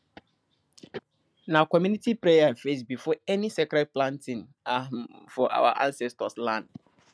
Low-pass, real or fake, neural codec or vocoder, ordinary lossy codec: none; real; none; none